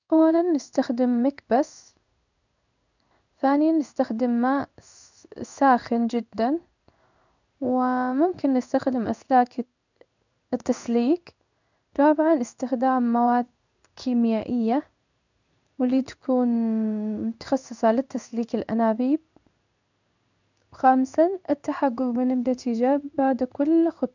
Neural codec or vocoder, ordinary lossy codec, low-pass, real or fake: codec, 16 kHz in and 24 kHz out, 1 kbps, XY-Tokenizer; none; 7.2 kHz; fake